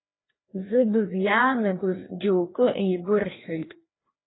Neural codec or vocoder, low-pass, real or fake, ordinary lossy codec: codec, 16 kHz, 1 kbps, FreqCodec, larger model; 7.2 kHz; fake; AAC, 16 kbps